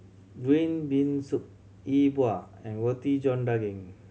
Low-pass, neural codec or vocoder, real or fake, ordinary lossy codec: none; none; real; none